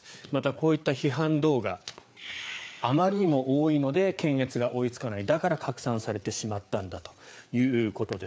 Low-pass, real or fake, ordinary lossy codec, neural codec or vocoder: none; fake; none; codec, 16 kHz, 4 kbps, FreqCodec, larger model